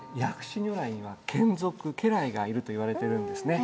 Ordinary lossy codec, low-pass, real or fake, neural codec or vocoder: none; none; real; none